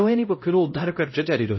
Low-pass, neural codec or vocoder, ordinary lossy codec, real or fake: 7.2 kHz; codec, 16 kHz, 0.5 kbps, X-Codec, HuBERT features, trained on LibriSpeech; MP3, 24 kbps; fake